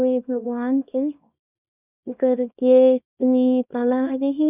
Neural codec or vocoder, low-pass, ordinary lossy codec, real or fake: codec, 24 kHz, 0.9 kbps, WavTokenizer, small release; 3.6 kHz; none; fake